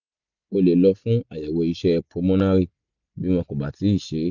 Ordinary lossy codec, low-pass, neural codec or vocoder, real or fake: none; 7.2 kHz; none; real